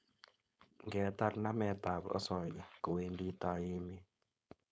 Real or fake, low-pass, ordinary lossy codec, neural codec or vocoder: fake; none; none; codec, 16 kHz, 4.8 kbps, FACodec